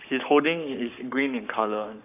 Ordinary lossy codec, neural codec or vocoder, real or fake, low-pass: none; codec, 44.1 kHz, 7.8 kbps, Pupu-Codec; fake; 3.6 kHz